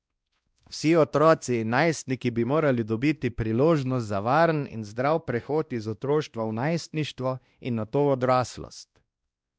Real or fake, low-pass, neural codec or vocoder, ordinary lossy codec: fake; none; codec, 16 kHz, 1 kbps, X-Codec, WavLM features, trained on Multilingual LibriSpeech; none